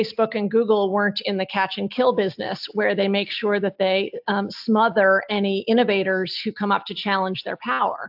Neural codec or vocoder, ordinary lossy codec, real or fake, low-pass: none; AAC, 48 kbps; real; 5.4 kHz